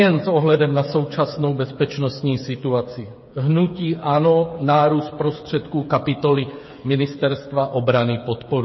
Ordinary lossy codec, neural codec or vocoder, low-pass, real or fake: MP3, 24 kbps; codec, 16 kHz, 8 kbps, FreqCodec, smaller model; 7.2 kHz; fake